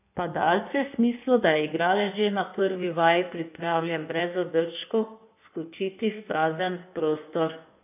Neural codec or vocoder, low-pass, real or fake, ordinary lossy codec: codec, 16 kHz in and 24 kHz out, 1.1 kbps, FireRedTTS-2 codec; 3.6 kHz; fake; AAC, 32 kbps